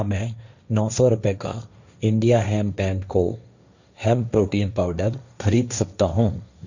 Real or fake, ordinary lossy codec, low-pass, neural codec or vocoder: fake; none; 7.2 kHz; codec, 16 kHz, 1.1 kbps, Voila-Tokenizer